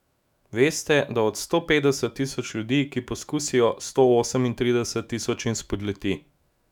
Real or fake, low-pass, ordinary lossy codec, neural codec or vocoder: fake; 19.8 kHz; none; autoencoder, 48 kHz, 128 numbers a frame, DAC-VAE, trained on Japanese speech